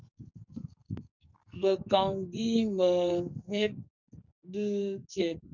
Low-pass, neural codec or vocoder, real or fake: 7.2 kHz; codec, 44.1 kHz, 2.6 kbps, SNAC; fake